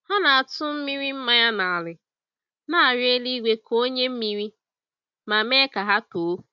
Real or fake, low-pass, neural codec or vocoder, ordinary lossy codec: real; 7.2 kHz; none; none